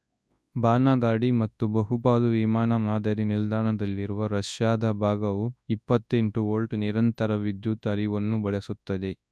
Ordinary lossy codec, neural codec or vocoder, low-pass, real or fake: none; codec, 24 kHz, 0.9 kbps, WavTokenizer, large speech release; none; fake